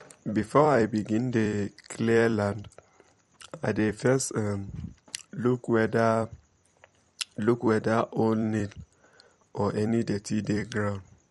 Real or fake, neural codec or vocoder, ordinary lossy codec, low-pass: fake; vocoder, 44.1 kHz, 128 mel bands every 256 samples, BigVGAN v2; MP3, 48 kbps; 19.8 kHz